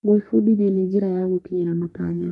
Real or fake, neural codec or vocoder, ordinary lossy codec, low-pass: fake; codec, 44.1 kHz, 3.4 kbps, Pupu-Codec; none; 10.8 kHz